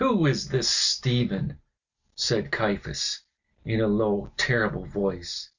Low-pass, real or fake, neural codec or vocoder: 7.2 kHz; real; none